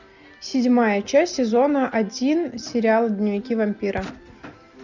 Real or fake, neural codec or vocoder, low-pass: real; none; 7.2 kHz